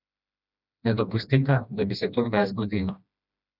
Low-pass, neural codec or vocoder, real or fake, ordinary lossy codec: 5.4 kHz; codec, 16 kHz, 1 kbps, FreqCodec, smaller model; fake; none